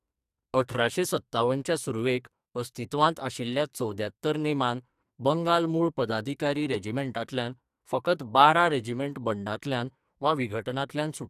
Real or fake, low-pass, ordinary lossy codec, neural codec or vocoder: fake; 14.4 kHz; AAC, 96 kbps; codec, 44.1 kHz, 2.6 kbps, SNAC